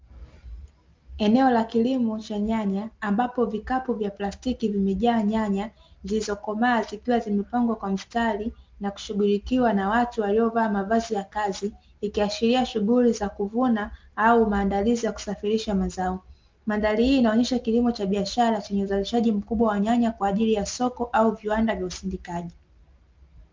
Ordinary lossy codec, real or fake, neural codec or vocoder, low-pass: Opus, 32 kbps; real; none; 7.2 kHz